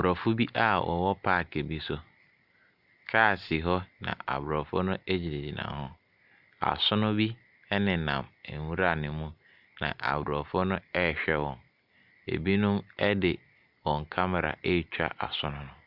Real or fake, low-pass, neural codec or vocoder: real; 5.4 kHz; none